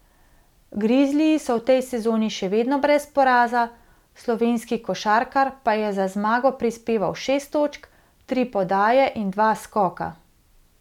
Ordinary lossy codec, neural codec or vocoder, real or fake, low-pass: none; none; real; 19.8 kHz